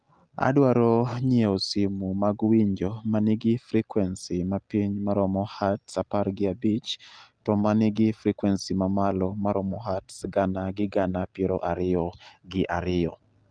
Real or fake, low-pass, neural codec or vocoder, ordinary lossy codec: real; 9.9 kHz; none; Opus, 32 kbps